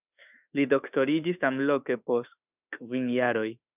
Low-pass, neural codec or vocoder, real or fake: 3.6 kHz; autoencoder, 48 kHz, 32 numbers a frame, DAC-VAE, trained on Japanese speech; fake